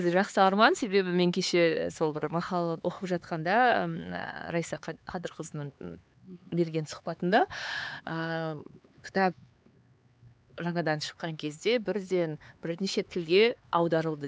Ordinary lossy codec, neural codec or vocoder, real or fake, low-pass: none; codec, 16 kHz, 4 kbps, X-Codec, HuBERT features, trained on LibriSpeech; fake; none